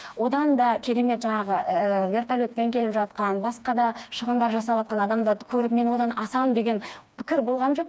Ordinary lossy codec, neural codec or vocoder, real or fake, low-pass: none; codec, 16 kHz, 2 kbps, FreqCodec, smaller model; fake; none